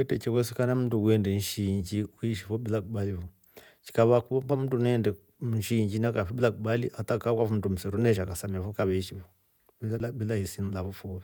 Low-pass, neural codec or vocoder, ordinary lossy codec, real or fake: none; vocoder, 48 kHz, 128 mel bands, Vocos; none; fake